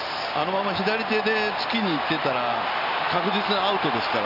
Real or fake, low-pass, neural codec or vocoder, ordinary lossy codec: real; 5.4 kHz; none; none